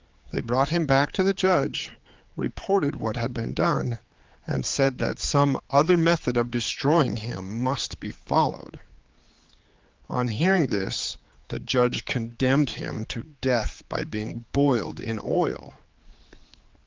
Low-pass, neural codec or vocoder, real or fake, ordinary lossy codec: 7.2 kHz; codec, 16 kHz, 4 kbps, X-Codec, HuBERT features, trained on general audio; fake; Opus, 32 kbps